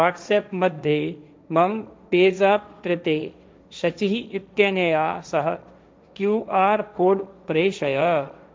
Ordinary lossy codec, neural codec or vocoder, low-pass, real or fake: none; codec, 16 kHz, 1.1 kbps, Voila-Tokenizer; none; fake